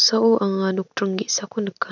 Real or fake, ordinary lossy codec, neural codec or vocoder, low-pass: real; none; none; 7.2 kHz